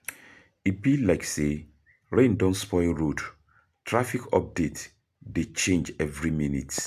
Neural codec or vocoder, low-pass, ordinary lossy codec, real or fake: none; 14.4 kHz; AAC, 96 kbps; real